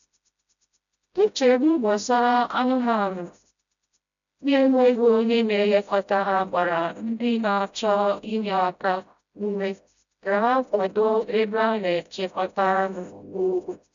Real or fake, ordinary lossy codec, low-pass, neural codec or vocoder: fake; none; 7.2 kHz; codec, 16 kHz, 0.5 kbps, FreqCodec, smaller model